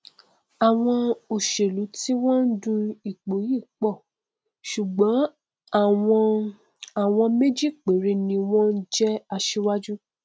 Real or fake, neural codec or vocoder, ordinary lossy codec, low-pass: real; none; none; none